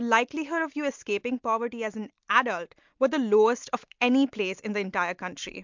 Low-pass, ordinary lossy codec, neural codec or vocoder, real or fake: 7.2 kHz; MP3, 64 kbps; none; real